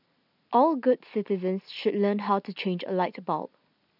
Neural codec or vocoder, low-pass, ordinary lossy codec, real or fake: none; 5.4 kHz; none; real